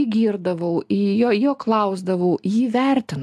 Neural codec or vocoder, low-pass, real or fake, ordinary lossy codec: none; 14.4 kHz; real; AAC, 96 kbps